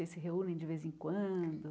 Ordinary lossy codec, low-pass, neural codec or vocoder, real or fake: none; none; none; real